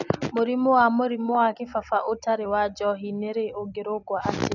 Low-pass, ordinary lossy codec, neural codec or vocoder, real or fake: 7.2 kHz; none; none; real